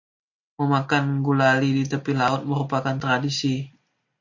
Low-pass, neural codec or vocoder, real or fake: 7.2 kHz; none; real